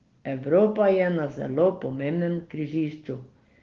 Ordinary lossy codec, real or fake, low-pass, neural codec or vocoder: Opus, 16 kbps; real; 7.2 kHz; none